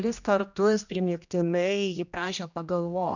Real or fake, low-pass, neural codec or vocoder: fake; 7.2 kHz; codec, 16 kHz, 1 kbps, X-Codec, HuBERT features, trained on general audio